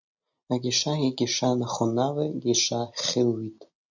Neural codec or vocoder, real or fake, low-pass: none; real; 7.2 kHz